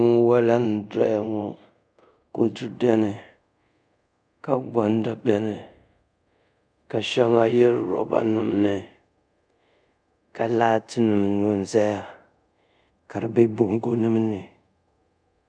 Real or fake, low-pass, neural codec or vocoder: fake; 9.9 kHz; codec, 24 kHz, 0.5 kbps, DualCodec